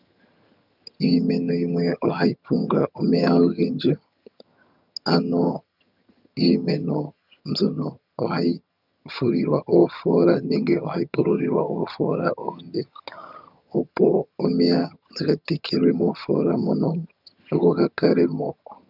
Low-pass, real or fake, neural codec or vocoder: 5.4 kHz; fake; vocoder, 22.05 kHz, 80 mel bands, HiFi-GAN